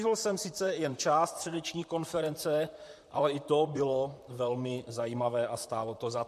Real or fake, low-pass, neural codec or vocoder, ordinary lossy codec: fake; 14.4 kHz; vocoder, 44.1 kHz, 128 mel bands, Pupu-Vocoder; MP3, 64 kbps